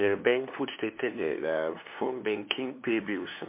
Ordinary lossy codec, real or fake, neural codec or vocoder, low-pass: MP3, 24 kbps; fake; codec, 16 kHz, 2 kbps, FunCodec, trained on LibriTTS, 25 frames a second; 3.6 kHz